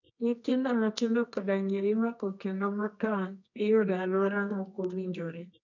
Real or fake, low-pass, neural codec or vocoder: fake; 7.2 kHz; codec, 24 kHz, 0.9 kbps, WavTokenizer, medium music audio release